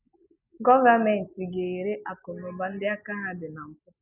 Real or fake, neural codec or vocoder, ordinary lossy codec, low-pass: real; none; none; 3.6 kHz